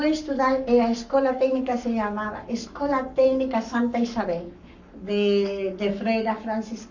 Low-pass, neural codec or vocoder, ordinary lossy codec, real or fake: 7.2 kHz; codec, 44.1 kHz, 7.8 kbps, Pupu-Codec; none; fake